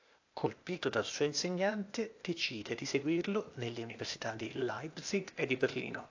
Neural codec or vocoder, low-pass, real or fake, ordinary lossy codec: codec, 16 kHz, 0.8 kbps, ZipCodec; 7.2 kHz; fake; AAC, 48 kbps